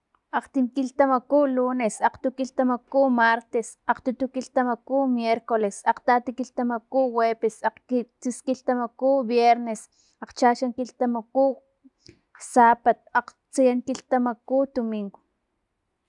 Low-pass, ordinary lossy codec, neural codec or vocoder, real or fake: 10.8 kHz; none; none; real